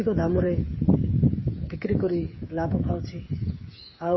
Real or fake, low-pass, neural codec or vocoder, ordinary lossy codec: fake; 7.2 kHz; codec, 16 kHz, 8 kbps, FreqCodec, smaller model; MP3, 24 kbps